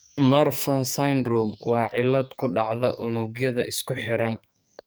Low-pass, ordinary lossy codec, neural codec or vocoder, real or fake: none; none; codec, 44.1 kHz, 2.6 kbps, SNAC; fake